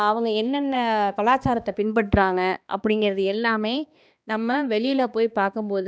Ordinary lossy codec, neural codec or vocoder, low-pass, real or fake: none; codec, 16 kHz, 2 kbps, X-Codec, HuBERT features, trained on balanced general audio; none; fake